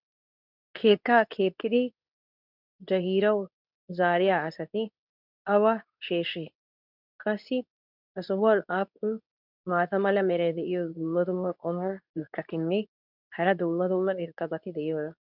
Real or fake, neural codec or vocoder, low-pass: fake; codec, 24 kHz, 0.9 kbps, WavTokenizer, medium speech release version 2; 5.4 kHz